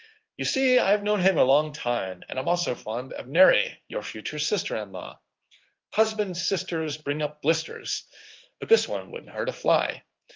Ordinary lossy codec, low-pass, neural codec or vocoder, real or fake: Opus, 24 kbps; 7.2 kHz; codec, 16 kHz in and 24 kHz out, 1 kbps, XY-Tokenizer; fake